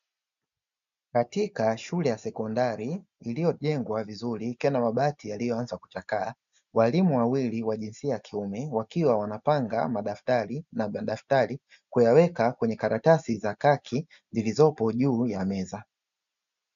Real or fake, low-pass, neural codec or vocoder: real; 7.2 kHz; none